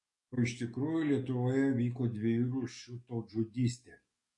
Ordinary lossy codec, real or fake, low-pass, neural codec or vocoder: MP3, 48 kbps; fake; 10.8 kHz; codec, 44.1 kHz, 7.8 kbps, DAC